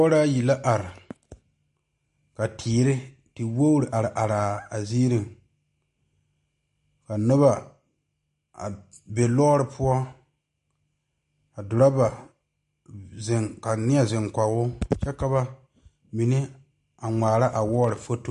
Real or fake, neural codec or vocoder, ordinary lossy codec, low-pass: real; none; MP3, 48 kbps; 14.4 kHz